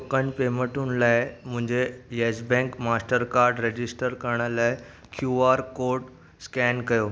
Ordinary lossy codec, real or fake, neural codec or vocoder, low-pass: none; real; none; none